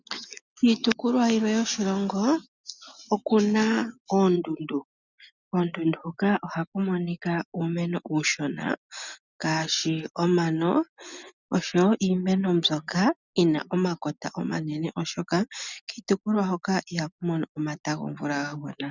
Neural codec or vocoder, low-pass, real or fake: none; 7.2 kHz; real